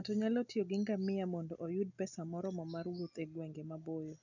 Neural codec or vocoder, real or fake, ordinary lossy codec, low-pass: none; real; none; 7.2 kHz